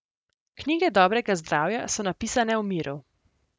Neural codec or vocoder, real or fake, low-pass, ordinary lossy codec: none; real; none; none